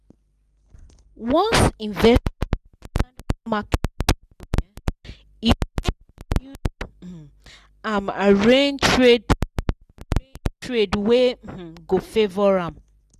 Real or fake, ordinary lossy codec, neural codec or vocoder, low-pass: real; none; none; 14.4 kHz